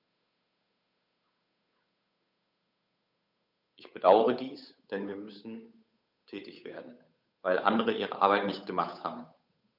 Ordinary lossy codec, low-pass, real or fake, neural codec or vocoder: none; 5.4 kHz; fake; codec, 16 kHz, 8 kbps, FunCodec, trained on Chinese and English, 25 frames a second